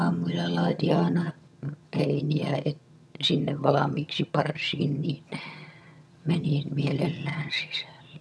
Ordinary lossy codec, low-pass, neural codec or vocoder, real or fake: none; none; vocoder, 22.05 kHz, 80 mel bands, HiFi-GAN; fake